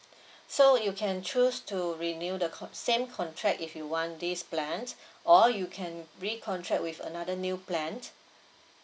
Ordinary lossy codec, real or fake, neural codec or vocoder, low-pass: none; real; none; none